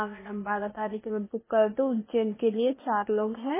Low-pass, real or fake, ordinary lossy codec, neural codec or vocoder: 3.6 kHz; fake; MP3, 16 kbps; codec, 16 kHz, 0.8 kbps, ZipCodec